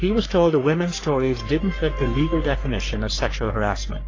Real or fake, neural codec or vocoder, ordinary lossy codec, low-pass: fake; codec, 44.1 kHz, 3.4 kbps, Pupu-Codec; AAC, 32 kbps; 7.2 kHz